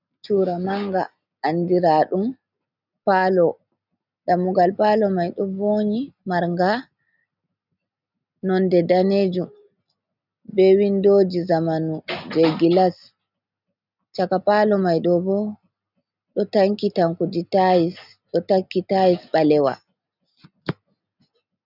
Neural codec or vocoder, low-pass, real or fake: none; 5.4 kHz; real